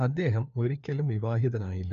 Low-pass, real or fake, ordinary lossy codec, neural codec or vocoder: 7.2 kHz; fake; none; codec, 16 kHz, 4 kbps, FunCodec, trained on LibriTTS, 50 frames a second